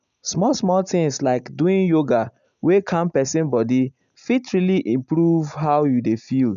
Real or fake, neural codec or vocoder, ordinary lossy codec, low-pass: real; none; none; 7.2 kHz